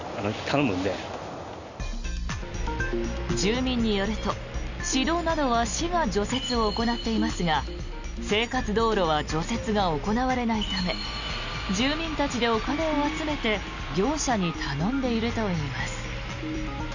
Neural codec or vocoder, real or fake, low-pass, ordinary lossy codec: none; real; 7.2 kHz; none